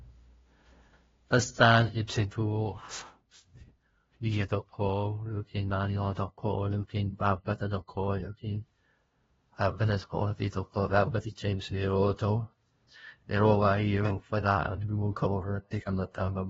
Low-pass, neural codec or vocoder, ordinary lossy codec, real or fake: 7.2 kHz; codec, 16 kHz, 0.5 kbps, FunCodec, trained on LibriTTS, 25 frames a second; AAC, 24 kbps; fake